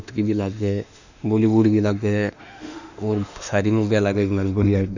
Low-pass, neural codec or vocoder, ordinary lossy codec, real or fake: 7.2 kHz; autoencoder, 48 kHz, 32 numbers a frame, DAC-VAE, trained on Japanese speech; none; fake